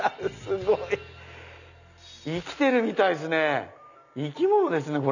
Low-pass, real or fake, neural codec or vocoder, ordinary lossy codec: 7.2 kHz; real; none; none